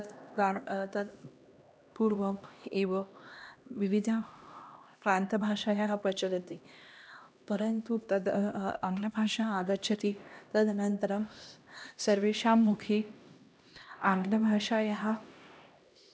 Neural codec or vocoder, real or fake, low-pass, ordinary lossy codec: codec, 16 kHz, 1 kbps, X-Codec, HuBERT features, trained on LibriSpeech; fake; none; none